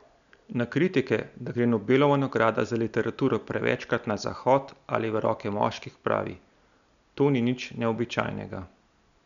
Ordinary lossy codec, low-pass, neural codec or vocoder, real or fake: none; 7.2 kHz; none; real